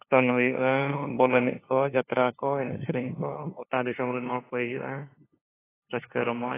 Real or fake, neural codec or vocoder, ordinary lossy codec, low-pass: fake; codec, 16 kHz, 2 kbps, FunCodec, trained on LibriTTS, 25 frames a second; AAC, 16 kbps; 3.6 kHz